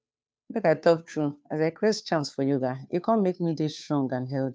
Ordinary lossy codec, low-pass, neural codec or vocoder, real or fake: none; none; codec, 16 kHz, 2 kbps, FunCodec, trained on Chinese and English, 25 frames a second; fake